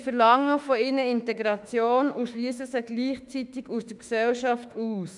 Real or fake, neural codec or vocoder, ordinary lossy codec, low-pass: fake; autoencoder, 48 kHz, 32 numbers a frame, DAC-VAE, trained on Japanese speech; none; 10.8 kHz